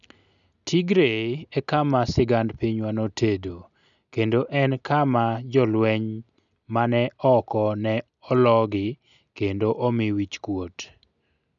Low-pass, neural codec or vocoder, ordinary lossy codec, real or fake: 7.2 kHz; none; none; real